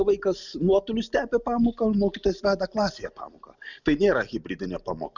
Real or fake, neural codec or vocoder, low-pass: real; none; 7.2 kHz